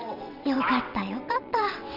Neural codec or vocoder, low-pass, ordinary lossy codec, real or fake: vocoder, 22.05 kHz, 80 mel bands, WaveNeXt; 5.4 kHz; none; fake